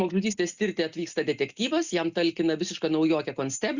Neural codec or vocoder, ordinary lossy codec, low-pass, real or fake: none; Opus, 64 kbps; 7.2 kHz; real